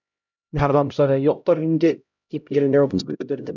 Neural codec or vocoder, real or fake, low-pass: codec, 16 kHz, 0.5 kbps, X-Codec, HuBERT features, trained on LibriSpeech; fake; 7.2 kHz